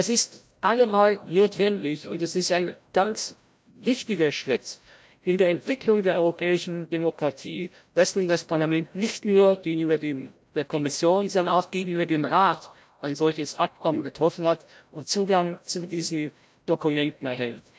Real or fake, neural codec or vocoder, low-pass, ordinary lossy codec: fake; codec, 16 kHz, 0.5 kbps, FreqCodec, larger model; none; none